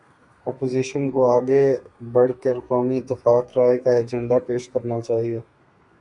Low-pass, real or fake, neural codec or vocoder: 10.8 kHz; fake; codec, 32 kHz, 1.9 kbps, SNAC